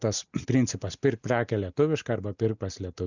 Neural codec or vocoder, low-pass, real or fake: none; 7.2 kHz; real